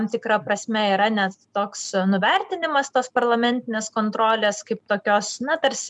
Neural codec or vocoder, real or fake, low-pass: none; real; 10.8 kHz